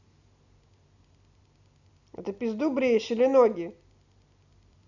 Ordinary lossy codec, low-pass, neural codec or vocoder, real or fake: none; 7.2 kHz; none; real